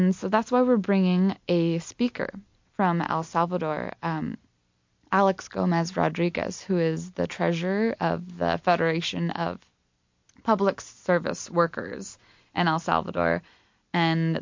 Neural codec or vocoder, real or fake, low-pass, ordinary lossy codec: none; real; 7.2 kHz; MP3, 48 kbps